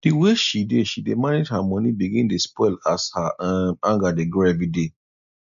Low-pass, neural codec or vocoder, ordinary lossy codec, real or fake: 7.2 kHz; none; none; real